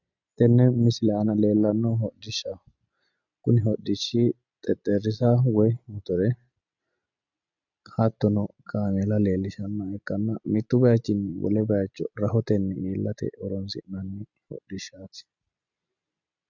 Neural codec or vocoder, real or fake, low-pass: none; real; 7.2 kHz